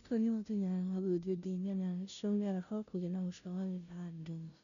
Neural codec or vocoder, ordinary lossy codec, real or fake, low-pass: codec, 16 kHz, 0.5 kbps, FunCodec, trained on Chinese and English, 25 frames a second; none; fake; 7.2 kHz